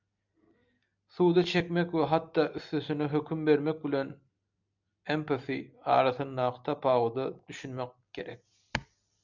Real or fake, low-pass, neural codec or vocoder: real; 7.2 kHz; none